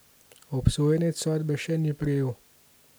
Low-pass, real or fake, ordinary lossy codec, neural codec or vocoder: none; real; none; none